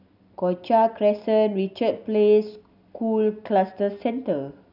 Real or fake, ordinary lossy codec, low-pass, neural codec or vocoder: real; none; 5.4 kHz; none